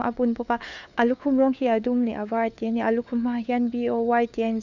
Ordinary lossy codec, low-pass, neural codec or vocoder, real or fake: none; 7.2 kHz; codec, 16 kHz, 4 kbps, FunCodec, trained on LibriTTS, 50 frames a second; fake